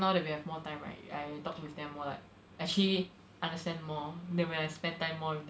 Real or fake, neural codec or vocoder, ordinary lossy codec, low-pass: real; none; none; none